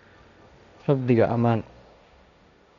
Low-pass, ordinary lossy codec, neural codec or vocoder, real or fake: 7.2 kHz; none; codec, 16 kHz, 1.1 kbps, Voila-Tokenizer; fake